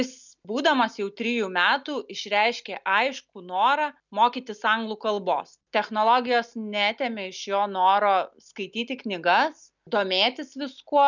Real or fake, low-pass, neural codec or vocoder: real; 7.2 kHz; none